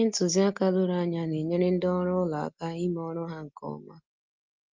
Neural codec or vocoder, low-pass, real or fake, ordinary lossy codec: none; 7.2 kHz; real; Opus, 24 kbps